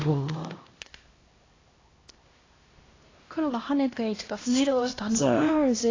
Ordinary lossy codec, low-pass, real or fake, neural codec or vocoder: AAC, 32 kbps; 7.2 kHz; fake; codec, 16 kHz, 1 kbps, X-Codec, HuBERT features, trained on LibriSpeech